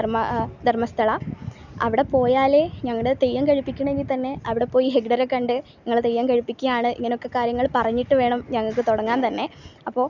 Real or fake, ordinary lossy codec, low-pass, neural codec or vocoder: real; none; 7.2 kHz; none